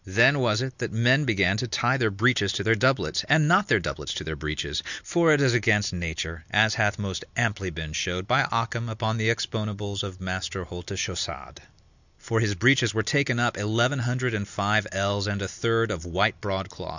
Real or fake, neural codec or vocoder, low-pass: real; none; 7.2 kHz